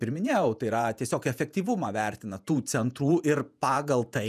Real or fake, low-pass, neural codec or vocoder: fake; 14.4 kHz; vocoder, 48 kHz, 128 mel bands, Vocos